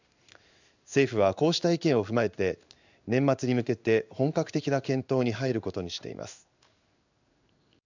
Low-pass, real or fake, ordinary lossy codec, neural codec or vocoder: 7.2 kHz; fake; none; codec, 16 kHz in and 24 kHz out, 1 kbps, XY-Tokenizer